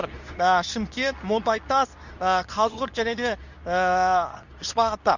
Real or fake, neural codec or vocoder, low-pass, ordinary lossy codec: fake; codec, 24 kHz, 0.9 kbps, WavTokenizer, medium speech release version 2; 7.2 kHz; none